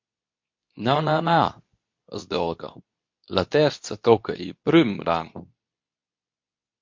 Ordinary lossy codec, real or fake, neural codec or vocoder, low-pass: MP3, 48 kbps; fake; codec, 24 kHz, 0.9 kbps, WavTokenizer, medium speech release version 2; 7.2 kHz